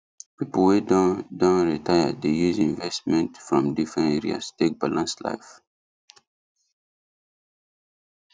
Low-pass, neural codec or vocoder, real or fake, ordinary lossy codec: none; none; real; none